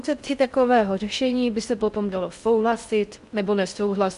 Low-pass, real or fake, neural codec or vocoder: 10.8 kHz; fake; codec, 16 kHz in and 24 kHz out, 0.6 kbps, FocalCodec, streaming, 4096 codes